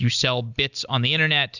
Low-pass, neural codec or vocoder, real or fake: 7.2 kHz; none; real